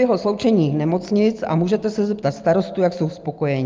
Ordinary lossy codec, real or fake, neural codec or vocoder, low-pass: Opus, 32 kbps; real; none; 7.2 kHz